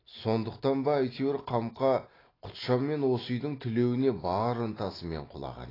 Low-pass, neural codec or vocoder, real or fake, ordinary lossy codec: 5.4 kHz; none; real; AAC, 24 kbps